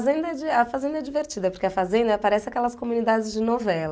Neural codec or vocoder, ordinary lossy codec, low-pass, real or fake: none; none; none; real